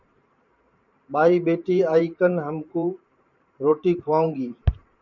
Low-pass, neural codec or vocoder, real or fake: 7.2 kHz; vocoder, 44.1 kHz, 128 mel bands every 512 samples, BigVGAN v2; fake